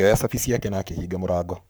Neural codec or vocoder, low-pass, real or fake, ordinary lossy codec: codec, 44.1 kHz, 7.8 kbps, Pupu-Codec; none; fake; none